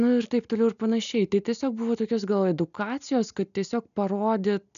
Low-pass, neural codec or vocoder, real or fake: 7.2 kHz; none; real